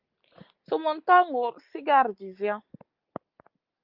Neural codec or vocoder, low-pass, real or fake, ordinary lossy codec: none; 5.4 kHz; real; Opus, 24 kbps